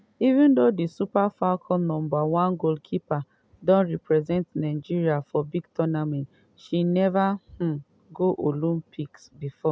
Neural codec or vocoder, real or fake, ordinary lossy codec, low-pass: none; real; none; none